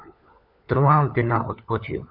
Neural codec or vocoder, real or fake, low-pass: codec, 16 kHz, 4 kbps, FunCodec, trained on LibriTTS, 50 frames a second; fake; 5.4 kHz